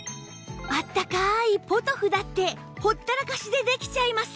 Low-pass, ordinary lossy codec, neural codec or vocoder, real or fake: none; none; none; real